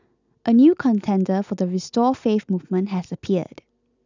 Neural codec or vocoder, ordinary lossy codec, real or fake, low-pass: none; none; real; 7.2 kHz